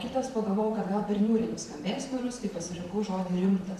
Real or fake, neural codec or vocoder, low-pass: fake; vocoder, 44.1 kHz, 128 mel bands, Pupu-Vocoder; 14.4 kHz